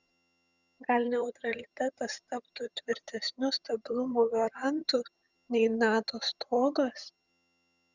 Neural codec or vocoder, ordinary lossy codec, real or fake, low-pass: vocoder, 22.05 kHz, 80 mel bands, HiFi-GAN; Opus, 64 kbps; fake; 7.2 kHz